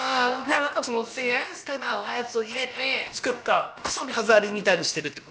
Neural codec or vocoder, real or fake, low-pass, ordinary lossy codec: codec, 16 kHz, about 1 kbps, DyCAST, with the encoder's durations; fake; none; none